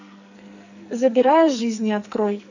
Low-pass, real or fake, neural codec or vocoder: 7.2 kHz; fake; codec, 44.1 kHz, 2.6 kbps, SNAC